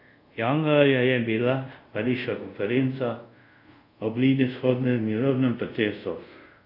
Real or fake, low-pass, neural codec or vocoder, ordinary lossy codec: fake; 5.4 kHz; codec, 24 kHz, 0.5 kbps, DualCodec; none